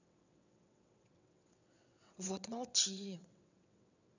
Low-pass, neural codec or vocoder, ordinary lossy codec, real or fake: 7.2 kHz; vocoder, 22.05 kHz, 80 mel bands, WaveNeXt; none; fake